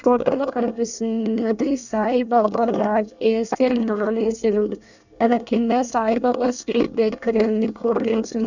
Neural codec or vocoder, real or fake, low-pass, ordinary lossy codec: codec, 24 kHz, 1 kbps, SNAC; fake; 7.2 kHz; none